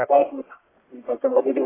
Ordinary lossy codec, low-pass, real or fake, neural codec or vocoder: AAC, 16 kbps; 3.6 kHz; fake; codec, 44.1 kHz, 1.7 kbps, Pupu-Codec